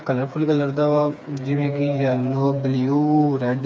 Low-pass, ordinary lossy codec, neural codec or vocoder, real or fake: none; none; codec, 16 kHz, 4 kbps, FreqCodec, smaller model; fake